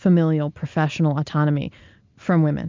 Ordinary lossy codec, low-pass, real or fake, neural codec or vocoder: MP3, 64 kbps; 7.2 kHz; real; none